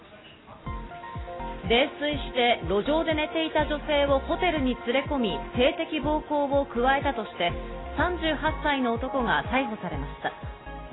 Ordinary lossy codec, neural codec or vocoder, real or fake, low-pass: AAC, 16 kbps; none; real; 7.2 kHz